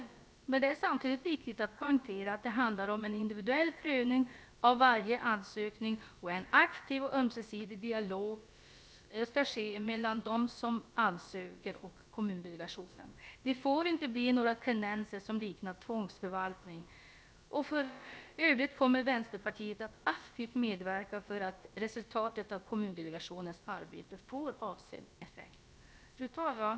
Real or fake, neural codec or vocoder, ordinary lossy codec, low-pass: fake; codec, 16 kHz, about 1 kbps, DyCAST, with the encoder's durations; none; none